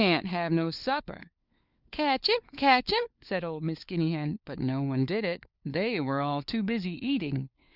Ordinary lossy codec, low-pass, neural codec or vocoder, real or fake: Opus, 64 kbps; 5.4 kHz; codec, 16 kHz, 4 kbps, FunCodec, trained on LibriTTS, 50 frames a second; fake